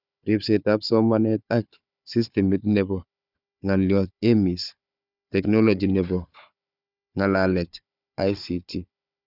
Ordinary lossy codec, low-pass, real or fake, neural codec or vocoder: none; 5.4 kHz; fake; codec, 16 kHz, 4 kbps, FunCodec, trained on Chinese and English, 50 frames a second